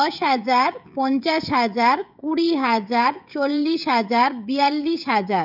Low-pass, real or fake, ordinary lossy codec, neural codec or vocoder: 5.4 kHz; fake; none; codec, 16 kHz, 16 kbps, FreqCodec, smaller model